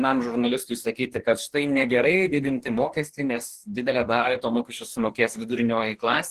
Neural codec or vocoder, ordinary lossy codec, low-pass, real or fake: codec, 44.1 kHz, 2.6 kbps, DAC; Opus, 16 kbps; 14.4 kHz; fake